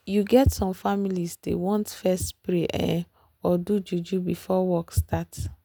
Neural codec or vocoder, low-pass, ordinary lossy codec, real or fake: none; none; none; real